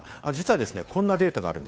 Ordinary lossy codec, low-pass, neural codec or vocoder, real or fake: none; none; codec, 16 kHz, 2 kbps, FunCodec, trained on Chinese and English, 25 frames a second; fake